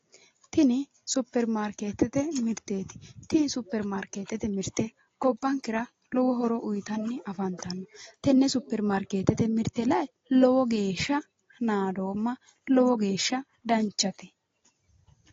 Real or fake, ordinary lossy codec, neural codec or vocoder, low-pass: real; AAC, 32 kbps; none; 7.2 kHz